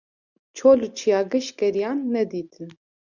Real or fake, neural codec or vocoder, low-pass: real; none; 7.2 kHz